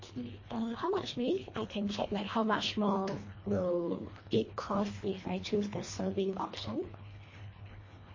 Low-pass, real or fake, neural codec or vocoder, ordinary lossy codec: 7.2 kHz; fake; codec, 24 kHz, 1.5 kbps, HILCodec; MP3, 32 kbps